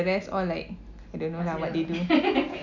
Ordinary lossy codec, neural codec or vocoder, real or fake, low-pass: none; none; real; 7.2 kHz